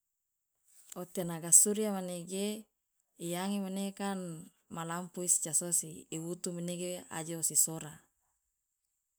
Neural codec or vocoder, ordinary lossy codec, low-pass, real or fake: none; none; none; real